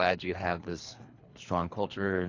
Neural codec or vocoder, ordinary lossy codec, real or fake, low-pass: codec, 24 kHz, 3 kbps, HILCodec; AAC, 48 kbps; fake; 7.2 kHz